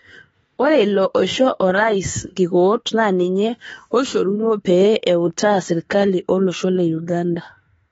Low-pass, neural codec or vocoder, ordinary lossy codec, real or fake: 19.8 kHz; autoencoder, 48 kHz, 32 numbers a frame, DAC-VAE, trained on Japanese speech; AAC, 24 kbps; fake